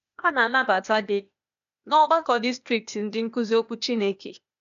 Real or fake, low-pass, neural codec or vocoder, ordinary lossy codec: fake; 7.2 kHz; codec, 16 kHz, 0.8 kbps, ZipCodec; none